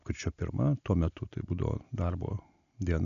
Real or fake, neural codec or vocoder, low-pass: real; none; 7.2 kHz